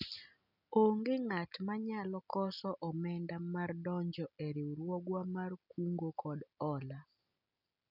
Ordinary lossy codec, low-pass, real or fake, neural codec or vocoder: none; 5.4 kHz; real; none